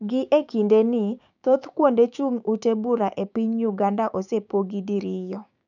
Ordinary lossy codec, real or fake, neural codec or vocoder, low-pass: none; fake; codec, 16 kHz, 6 kbps, DAC; 7.2 kHz